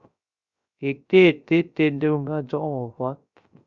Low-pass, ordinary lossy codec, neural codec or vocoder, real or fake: 7.2 kHz; Opus, 64 kbps; codec, 16 kHz, 0.3 kbps, FocalCodec; fake